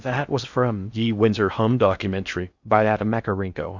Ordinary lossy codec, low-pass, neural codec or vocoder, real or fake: Opus, 64 kbps; 7.2 kHz; codec, 16 kHz in and 24 kHz out, 0.6 kbps, FocalCodec, streaming, 2048 codes; fake